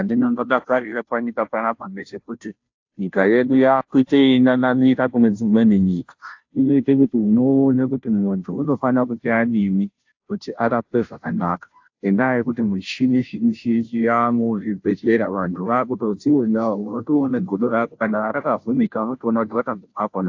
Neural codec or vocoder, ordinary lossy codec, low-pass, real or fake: codec, 16 kHz, 0.5 kbps, FunCodec, trained on Chinese and English, 25 frames a second; AAC, 48 kbps; 7.2 kHz; fake